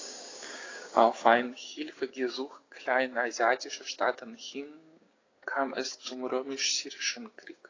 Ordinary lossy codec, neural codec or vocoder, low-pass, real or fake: AAC, 32 kbps; codec, 16 kHz, 6 kbps, DAC; 7.2 kHz; fake